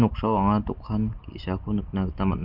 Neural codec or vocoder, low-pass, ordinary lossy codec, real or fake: none; 5.4 kHz; Opus, 24 kbps; real